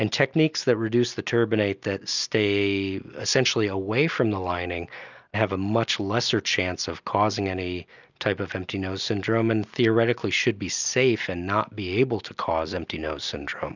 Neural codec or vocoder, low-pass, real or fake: none; 7.2 kHz; real